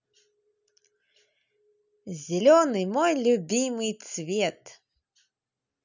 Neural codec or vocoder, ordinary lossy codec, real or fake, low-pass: none; none; real; 7.2 kHz